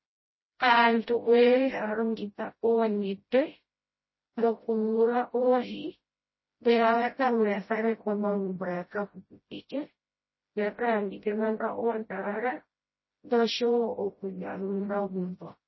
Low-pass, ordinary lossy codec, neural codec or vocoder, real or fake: 7.2 kHz; MP3, 24 kbps; codec, 16 kHz, 0.5 kbps, FreqCodec, smaller model; fake